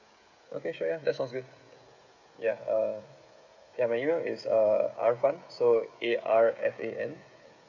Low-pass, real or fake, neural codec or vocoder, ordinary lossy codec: 7.2 kHz; fake; codec, 16 kHz, 16 kbps, FreqCodec, smaller model; none